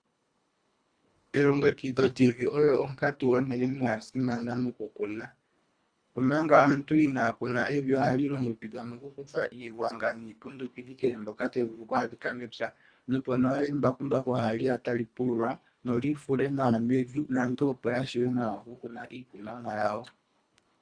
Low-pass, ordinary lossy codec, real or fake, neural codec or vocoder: 9.9 kHz; Opus, 64 kbps; fake; codec, 24 kHz, 1.5 kbps, HILCodec